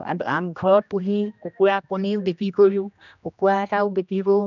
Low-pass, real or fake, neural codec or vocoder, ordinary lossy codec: 7.2 kHz; fake; codec, 16 kHz, 1 kbps, X-Codec, HuBERT features, trained on general audio; none